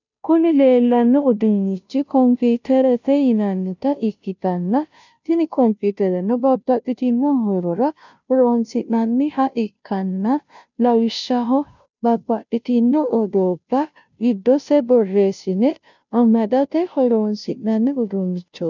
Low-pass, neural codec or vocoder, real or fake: 7.2 kHz; codec, 16 kHz, 0.5 kbps, FunCodec, trained on Chinese and English, 25 frames a second; fake